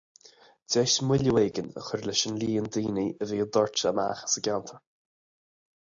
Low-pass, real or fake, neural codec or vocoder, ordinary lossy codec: 7.2 kHz; real; none; AAC, 64 kbps